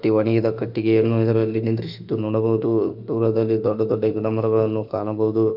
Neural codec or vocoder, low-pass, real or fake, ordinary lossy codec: autoencoder, 48 kHz, 32 numbers a frame, DAC-VAE, trained on Japanese speech; 5.4 kHz; fake; none